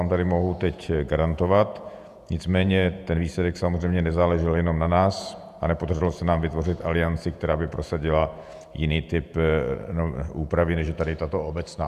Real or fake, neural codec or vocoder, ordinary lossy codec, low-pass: fake; vocoder, 48 kHz, 128 mel bands, Vocos; Opus, 64 kbps; 14.4 kHz